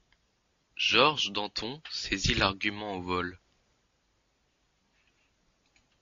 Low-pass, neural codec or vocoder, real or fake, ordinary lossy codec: 7.2 kHz; none; real; AAC, 48 kbps